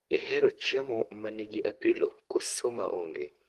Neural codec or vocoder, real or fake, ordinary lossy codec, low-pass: codec, 44.1 kHz, 2.6 kbps, SNAC; fake; Opus, 24 kbps; 14.4 kHz